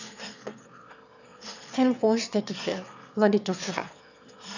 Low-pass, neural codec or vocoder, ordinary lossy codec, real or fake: 7.2 kHz; autoencoder, 22.05 kHz, a latent of 192 numbers a frame, VITS, trained on one speaker; none; fake